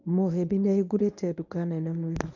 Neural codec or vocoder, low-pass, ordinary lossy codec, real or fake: codec, 24 kHz, 0.9 kbps, WavTokenizer, medium speech release version 1; 7.2 kHz; none; fake